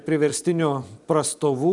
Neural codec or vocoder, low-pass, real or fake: vocoder, 44.1 kHz, 128 mel bands every 256 samples, BigVGAN v2; 10.8 kHz; fake